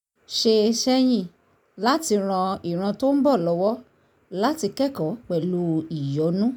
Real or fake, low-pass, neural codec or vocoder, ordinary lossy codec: real; none; none; none